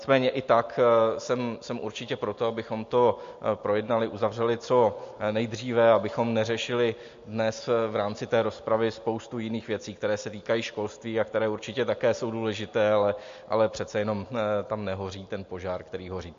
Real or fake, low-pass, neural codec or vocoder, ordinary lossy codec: real; 7.2 kHz; none; MP3, 48 kbps